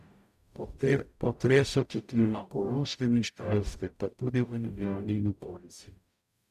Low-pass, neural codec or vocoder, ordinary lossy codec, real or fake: 14.4 kHz; codec, 44.1 kHz, 0.9 kbps, DAC; none; fake